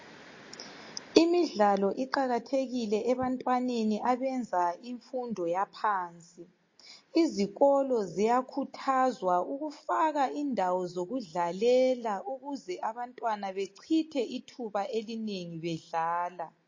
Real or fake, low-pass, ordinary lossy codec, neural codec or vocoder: real; 7.2 kHz; MP3, 32 kbps; none